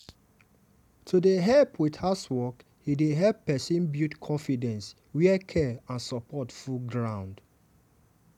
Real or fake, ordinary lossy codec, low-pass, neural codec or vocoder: real; none; 19.8 kHz; none